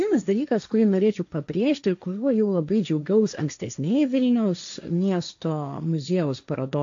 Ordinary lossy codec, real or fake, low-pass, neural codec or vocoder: AAC, 64 kbps; fake; 7.2 kHz; codec, 16 kHz, 1.1 kbps, Voila-Tokenizer